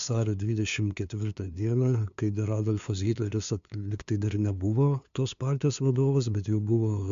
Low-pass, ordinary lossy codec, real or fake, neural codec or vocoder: 7.2 kHz; MP3, 64 kbps; fake; codec, 16 kHz, 2 kbps, FunCodec, trained on LibriTTS, 25 frames a second